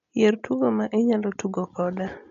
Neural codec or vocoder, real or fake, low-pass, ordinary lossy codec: none; real; 7.2 kHz; MP3, 48 kbps